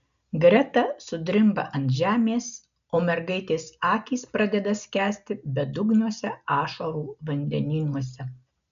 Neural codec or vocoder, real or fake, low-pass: none; real; 7.2 kHz